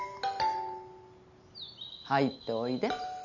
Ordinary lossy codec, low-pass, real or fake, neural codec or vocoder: none; 7.2 kHz; real; none